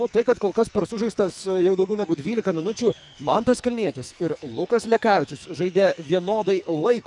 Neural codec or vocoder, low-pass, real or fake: codec, 44.1 kHz, 2.6 kbps, SNAC; 10.8 kHz; fake